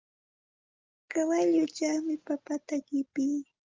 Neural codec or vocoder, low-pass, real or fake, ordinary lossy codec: none; 7.2 kHz; real; Opus, 32 kbps